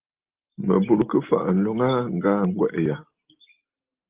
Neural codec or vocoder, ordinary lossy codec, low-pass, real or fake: none; Opus, 24 kbps; 3.6 kHz; real